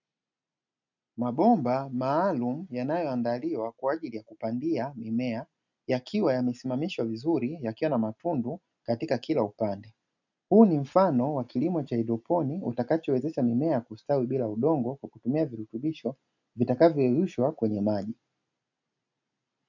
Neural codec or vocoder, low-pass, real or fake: none; 7.2 kHz; real